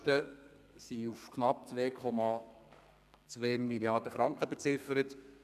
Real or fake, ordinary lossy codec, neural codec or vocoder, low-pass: fake; none; codec, 32 kHz, 1.9 kbps, SNAC; 14.4 kHz